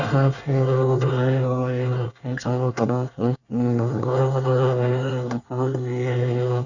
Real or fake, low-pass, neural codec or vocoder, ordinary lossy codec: fake; 7.2 kHz; codec, 24 kHz, 1 kbps, SNAC; none